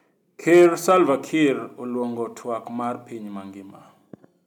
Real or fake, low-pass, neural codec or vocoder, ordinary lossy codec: real; 19.8 kHz; none; none